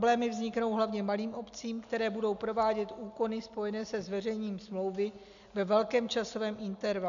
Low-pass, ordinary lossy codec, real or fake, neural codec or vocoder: 7.2 kHz; MP3, 96 kbps; real; none